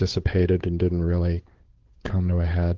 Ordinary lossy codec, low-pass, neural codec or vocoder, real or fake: Opus, 16 kbps; 7.2 kHz; codec, 16 kHz, 8 kbps, FunCodec, trained on Chinese and English, 25 frames a second; fake